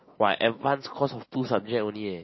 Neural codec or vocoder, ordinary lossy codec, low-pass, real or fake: none; MP3, 24 kbps; 7.2 kHz; real